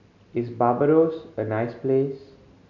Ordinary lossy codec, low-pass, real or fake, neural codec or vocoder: none; 7.2 kHz; real; none